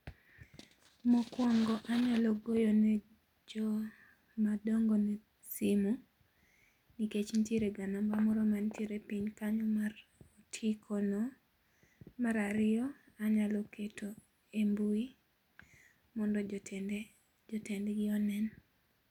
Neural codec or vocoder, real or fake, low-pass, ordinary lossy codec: none; real; 19.8 kHz; none